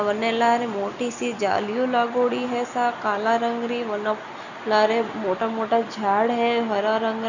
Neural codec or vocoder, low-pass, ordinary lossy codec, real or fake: none; 7.2 kHz; none; real